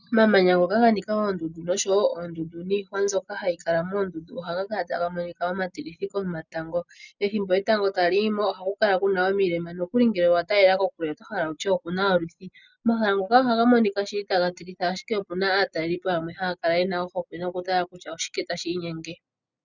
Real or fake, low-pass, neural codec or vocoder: real; 7.2 kHz; none